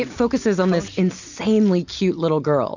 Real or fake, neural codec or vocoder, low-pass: real; none; 7.2 kHz